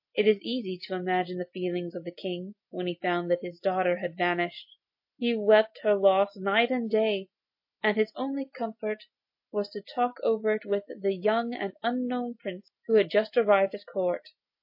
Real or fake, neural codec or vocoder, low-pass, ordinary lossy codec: real; none; 5.4 kHz; MP3, 32 kbps